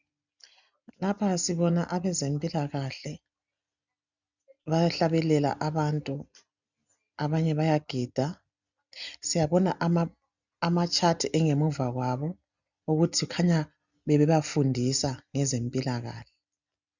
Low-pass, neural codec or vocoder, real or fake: 7.2 kHz; none; real